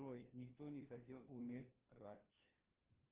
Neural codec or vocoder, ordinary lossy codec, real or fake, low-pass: codec, 16 kHz, 1 kbps, FunCodec, trained on LibriTTS, 50 frames a second; Opus, 16 kbps; fake; 3.6 kHz